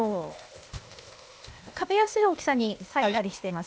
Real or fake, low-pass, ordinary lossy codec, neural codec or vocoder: fake; none; none; codec, 16 kHz, 0.8 kbps, ZipCodec